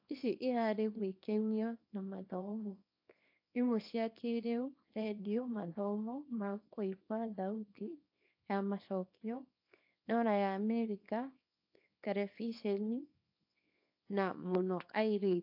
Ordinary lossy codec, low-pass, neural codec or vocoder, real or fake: AAC, 48 kbps; 5.4 kHz; codec, 24 kHz, 0.9 kbps, WavTokenizer, small release; fake